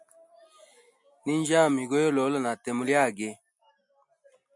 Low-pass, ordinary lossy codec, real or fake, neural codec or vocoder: 10.8 kHz; MP3, 96 kbps; real; none